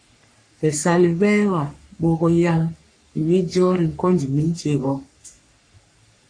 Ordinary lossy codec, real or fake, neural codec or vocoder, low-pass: AAC, 48 kbps; fake; codec, 44.1 kHz, 3.4 kbps, Pupu-Codec; 9.9 kHz